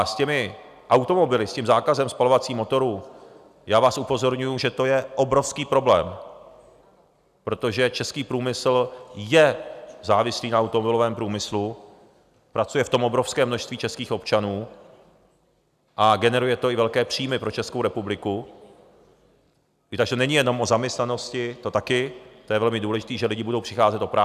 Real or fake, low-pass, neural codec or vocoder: real; 14.4 kHz; none